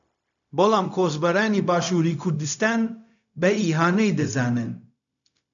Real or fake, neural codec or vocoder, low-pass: fake; codec, 16 kHz, 0.4 kbps, LongCat-Audio-Codec; 7.2 kHz